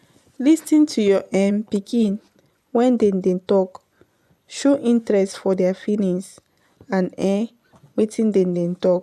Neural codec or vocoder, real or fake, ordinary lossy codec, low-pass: vocoder, 24 kHz, 100 mel bands, Vocos; fake; none; none